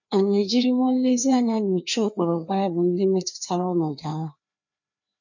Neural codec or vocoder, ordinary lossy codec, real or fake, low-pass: codec, 16 kHz, 2 kbps, FreqCodec, larger model; none; fake; 7.2 kHz